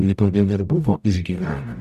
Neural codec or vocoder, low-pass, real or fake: codec, 44.1 kHz, 0.9 kbps, DAC; 14.4 kHz; fake